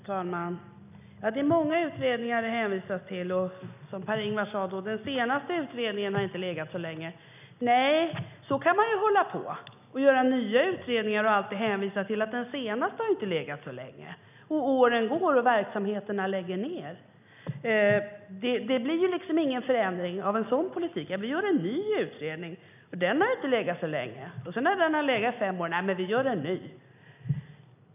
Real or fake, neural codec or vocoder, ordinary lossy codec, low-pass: real; none; none; 3.6 kHz